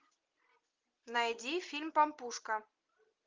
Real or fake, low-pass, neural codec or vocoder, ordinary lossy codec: real; 7.2 kHz; none; Opus, 24 kbps